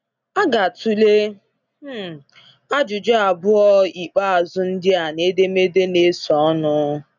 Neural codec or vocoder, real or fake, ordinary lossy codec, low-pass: none; real; none; 7.2 kHz